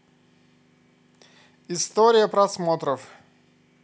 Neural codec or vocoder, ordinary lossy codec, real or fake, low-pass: none; none; real; none